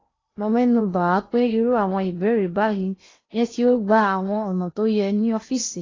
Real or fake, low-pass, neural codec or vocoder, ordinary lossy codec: fake; 7.2 kHz; codec, 16 kHz in and 24 kHz out, 0.8 kbps, FocalCodec, streaming, 65536 codes; AAC, 32 kbps